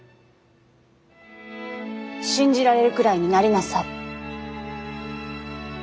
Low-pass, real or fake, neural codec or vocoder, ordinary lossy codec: none; real; none; none